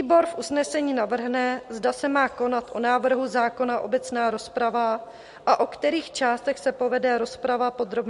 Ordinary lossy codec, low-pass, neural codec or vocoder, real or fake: MP3, 48 kbps; 10.8 kHz; none; real